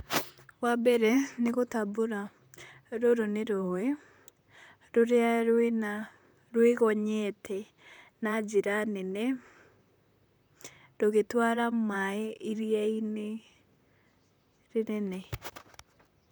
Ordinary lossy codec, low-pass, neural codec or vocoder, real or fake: none; none; vocoder, 44.1 kHz, 128 mel bands, Pupu-Vocoder; fake